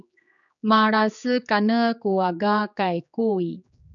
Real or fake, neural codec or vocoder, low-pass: fake; codec, 16 kHz, 4 kbps, X-Codec, HuBERT features, trained on general audio; 7.2 kHz